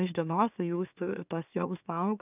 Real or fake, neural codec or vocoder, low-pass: fake; autoencoder, 44.1 kHz, a latent of 192 numbers a frame, MeloTTS; 3.6 kHz